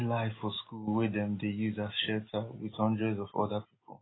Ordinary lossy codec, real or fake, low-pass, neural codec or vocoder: AAC, 16 kbps; real; 7.2 kHz; none